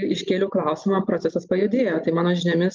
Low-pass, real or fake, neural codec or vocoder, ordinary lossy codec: 7.2 kHz; real; none; Opus, 32 kbps